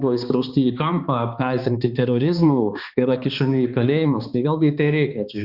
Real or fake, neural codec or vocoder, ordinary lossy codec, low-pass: fake; codec, 16 kHz, 2 kbps, X-Codec, HuBERT features, trained on balanced general audio; Opus, 64 kbps; 5.4 kHz